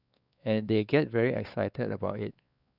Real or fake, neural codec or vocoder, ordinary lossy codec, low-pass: fake; codec, 16 kHz, 4 kbps, X-Codec, WavLM features, trained on Multilingual LibriSpeech; none; 5.4 kHz